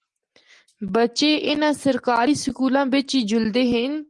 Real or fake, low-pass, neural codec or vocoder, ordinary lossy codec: real; 10.8 kHz; none; Opus, 24 kbps